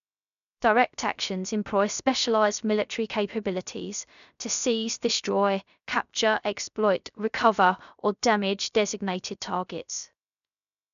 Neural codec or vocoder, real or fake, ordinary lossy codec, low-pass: codec, 16 kHz, 0.3 kbps, FocalCodec; fake; none; 7.2 kHz